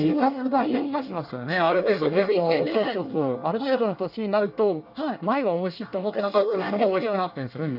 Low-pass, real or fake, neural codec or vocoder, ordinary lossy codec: 5.4 kHz; fake; codec, 24 kHz, 1 kbps, SNAC; none